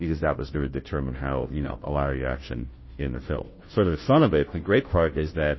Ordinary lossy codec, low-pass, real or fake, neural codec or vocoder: MP3, 24 kbps; 7.2 kHz; fake; codec, 16 kHz, 0.5 kbps, FunCodec, trained on Chinese and English, 25 frames a second